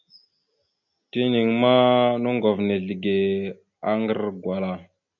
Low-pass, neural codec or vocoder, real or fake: 7.2 kHz; none; real